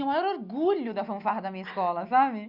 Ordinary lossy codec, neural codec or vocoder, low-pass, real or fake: Opus, 64 kbps; none; 5.4 kHz; real